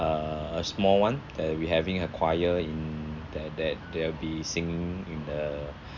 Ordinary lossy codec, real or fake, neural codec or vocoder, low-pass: none; real; none; 7.2 kHz